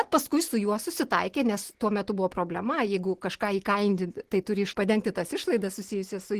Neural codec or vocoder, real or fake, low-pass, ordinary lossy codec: none; real; 14.4 kHz; Opus, 16 kbps